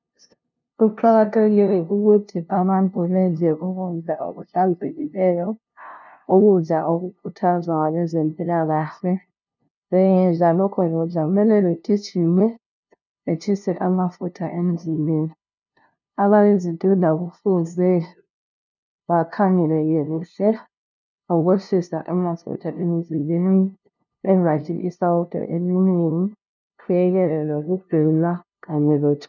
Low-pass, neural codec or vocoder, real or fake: 7.2 kHz; codec, 16 kHz, 0.5 kbps, FunCodec, trained on LibriTTS, 25 frames a second; fake